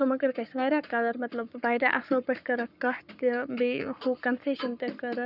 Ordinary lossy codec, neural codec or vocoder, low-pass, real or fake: none; codec, 44.1 kHz, 7.8 kbps, Pupu-Codec; 5.4 kHz; fake